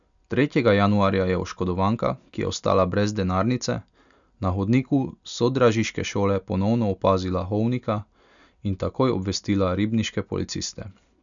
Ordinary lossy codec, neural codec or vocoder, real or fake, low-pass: none; none; real; 7.2 kHz